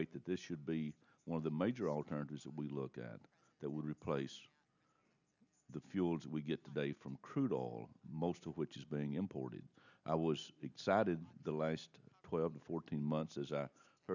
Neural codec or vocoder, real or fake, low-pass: none; real; 7.2 kHz